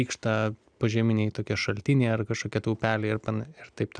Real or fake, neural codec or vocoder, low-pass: real; none; 9.9 kHz